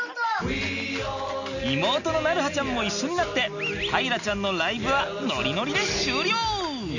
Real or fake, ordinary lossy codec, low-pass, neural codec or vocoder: real; none; 7.2 kHz; none